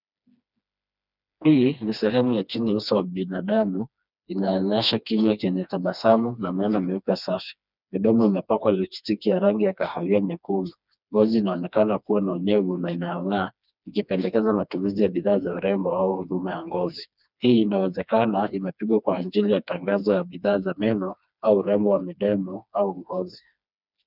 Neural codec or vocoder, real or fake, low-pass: codec, 16 kHz, 2 kbps, FreqCodec, smaller model; fake; 5.4 kHz